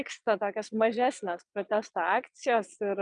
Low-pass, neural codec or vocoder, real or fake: 9.9 kHz; vocoder, 22.05 kHz, 80 mel bands, Vocos; fake